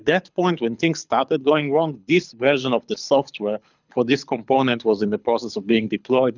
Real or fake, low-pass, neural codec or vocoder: fake; 7.2 kHz; codec, 24 kHz, 6 kbps, HILCodec